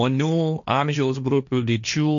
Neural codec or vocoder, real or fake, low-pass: codec, 16 kHz, 1.1 kbps, Voila-Tokenizer; fake; 7.2 kHz